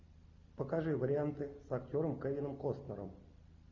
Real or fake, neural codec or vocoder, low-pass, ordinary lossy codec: real; none; 7.2 kHz; MP3, 64 kbps